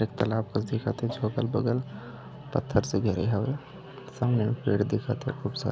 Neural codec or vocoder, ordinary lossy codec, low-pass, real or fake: none; none; none; real